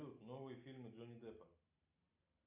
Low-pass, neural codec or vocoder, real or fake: 3.6 kHz; none; real